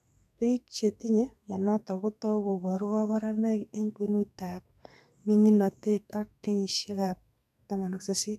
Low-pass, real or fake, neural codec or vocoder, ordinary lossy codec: 14.4 kHz; fake; codec, 32 kHz, 1.9 kbps, SNAC; none